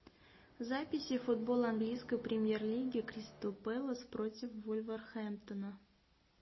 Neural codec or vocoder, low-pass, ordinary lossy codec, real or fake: none; 7.2 kHz; MP3, 24 kbps; real